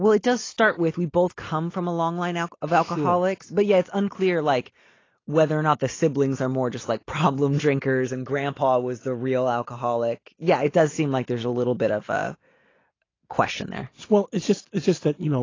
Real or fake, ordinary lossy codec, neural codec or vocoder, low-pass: real; AAC, 32 kbps; none; 7.2 kHz